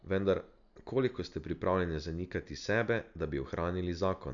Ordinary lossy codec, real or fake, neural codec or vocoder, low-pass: none; real; none; 7.2 kHz